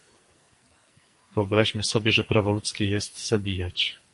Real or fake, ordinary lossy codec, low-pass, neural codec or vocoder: fake; MP3, 48 kbps; 14.4 kHz; codec, 44.1 kHz, 2.6 kbps, SNAC